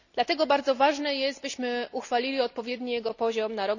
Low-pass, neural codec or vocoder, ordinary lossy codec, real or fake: 7.2 kHz; none; none; real